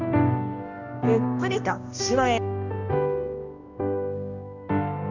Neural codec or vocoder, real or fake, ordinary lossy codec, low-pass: codec, 16 kHz, 1 kbps, X-Codec, HuBERT features, trained on balanced general audio; fake; none; 7.2 kHz